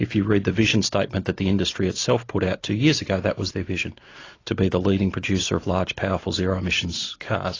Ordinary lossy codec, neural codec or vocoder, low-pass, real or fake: AAC, 32 kbps; none; 7.2 kHz; real